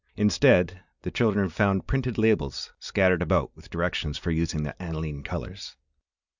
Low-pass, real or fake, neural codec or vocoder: 7.2 kHz; real; none